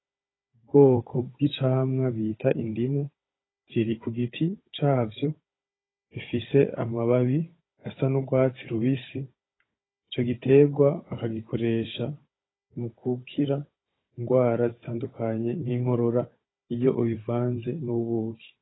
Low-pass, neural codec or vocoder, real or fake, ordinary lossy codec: 7.2 kHz; codec, 16 kHz, 16 kbps, FunCodec, trained on Chinese and English, 50 frames a second; fake; AAC, 16 kbps